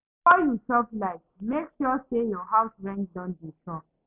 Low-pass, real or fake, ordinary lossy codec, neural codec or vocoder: 3.6 kHz; real; none; none